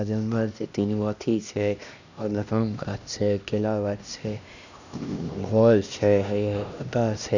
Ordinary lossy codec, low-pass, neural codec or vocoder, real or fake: none; 7.2 kHz; codec, 16 kHz, 1 kbps, X-Codec, HuBERT features, trained on LibriSpeech; fake